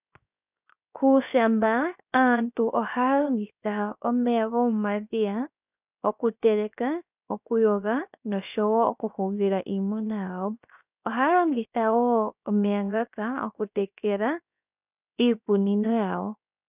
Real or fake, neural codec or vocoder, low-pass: fake; codec, 16 kHz, 0.7 kbps, FocalCodec; 3.6 kHz